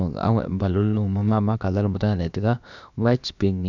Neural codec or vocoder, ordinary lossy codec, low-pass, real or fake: codec, 16 kHz, about 1 kbps, DyCAST, with the encoder's durations; none; 7.2 kHz; fake